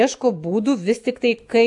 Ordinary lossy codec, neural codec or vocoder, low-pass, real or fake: AAC, 64 kbps; autoencoder, 48 kHz, 128 numbers a frame, DAC-VAE, trained on Japanese speech; 10.8 kHz; fake